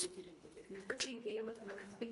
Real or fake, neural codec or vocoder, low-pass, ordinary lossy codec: fake; codec, 24 kHz, 1.5 kbps, HILCodec; 10.8 kHz; MP3, 64 kbps